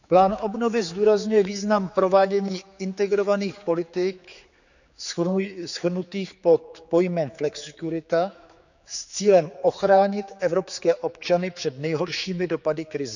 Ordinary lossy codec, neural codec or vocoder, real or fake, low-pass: none; codec, 16 kHz, 4 kbps, X-Codec, HuBERT features, trained on general audio; fake; 7.2 kHz